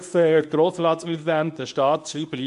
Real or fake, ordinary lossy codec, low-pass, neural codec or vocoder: fake; MP3, 64 kbps; 10.8 kHz; codec, 24 kHz, 0.9 kbps, WavTokenizer, medium speech release version 1